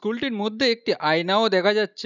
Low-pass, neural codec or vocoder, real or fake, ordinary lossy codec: 7.2 kHz; none; real; none